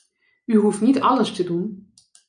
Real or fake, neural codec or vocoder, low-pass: real; none; 9.9 kHz